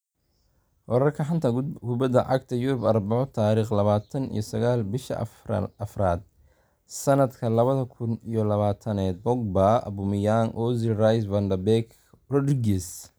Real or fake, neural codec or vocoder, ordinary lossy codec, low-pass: real; none; none; none